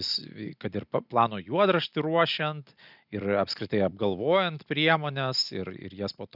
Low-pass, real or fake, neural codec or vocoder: 5.4 kHz; real; none